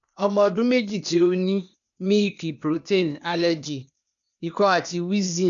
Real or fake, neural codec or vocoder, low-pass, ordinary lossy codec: fake; codec, 16 kHz, 0.8 kbps, ZipCodec; 7.2 kHz; none